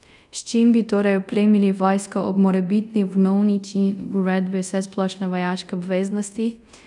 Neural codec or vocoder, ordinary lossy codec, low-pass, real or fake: codec, 24 kHz, 0.5 kbps, DualCodec; none; 10.8 kHz; fake